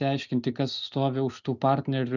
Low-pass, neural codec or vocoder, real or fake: 7.2 kHz; none; real